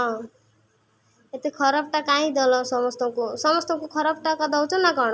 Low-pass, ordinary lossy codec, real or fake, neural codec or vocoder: none; none; real; none